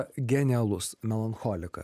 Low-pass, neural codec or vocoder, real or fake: 14.4 kHz; none; real